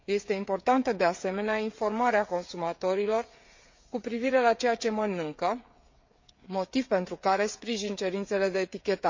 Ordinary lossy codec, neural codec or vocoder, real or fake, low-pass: MP3, 48 kbps; codec, 16 kHz, 16 kbps, FreqCodec, smaller model; fake; 7.2 kHz